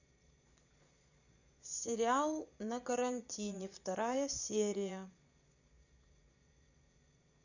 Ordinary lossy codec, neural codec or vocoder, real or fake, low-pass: none; vocoder, 22.05 kHz, 80 mel bands, Vocos; fake; 7.2 kHz